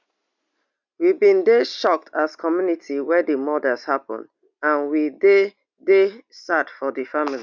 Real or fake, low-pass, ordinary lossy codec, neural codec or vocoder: real; 7.2 kHz; none; none